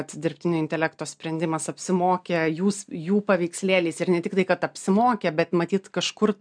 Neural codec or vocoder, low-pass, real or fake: none; 9.9 kHz; real